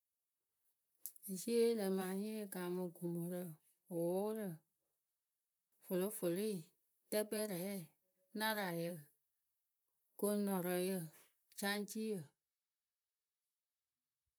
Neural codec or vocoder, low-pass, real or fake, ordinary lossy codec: vocoder, 44.1 kHz, 128 mel bands, Pupu-Vocoder; none; fake; none